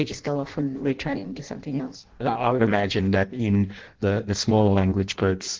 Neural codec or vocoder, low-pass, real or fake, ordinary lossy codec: codec, 16 kHz in and 24 kHz out, 0.6 kbps, FireRedTTS-2 codec; 7.2 kHz; fake; Opus, 16 kbps